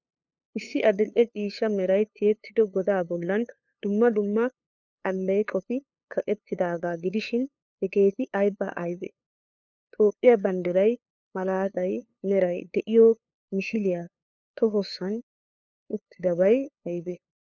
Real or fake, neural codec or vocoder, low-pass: fake; codec, 16 kHz, 2 kbps, FunCodec, trained on LibriTTS, 25 frames a second; 7.2 kHz